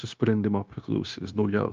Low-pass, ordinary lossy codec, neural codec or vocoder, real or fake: 7.2 kHz; Opus, 24 kbps; codec, 16 kHz, 0.9 kbps, LongCat-Audio-Codec; fake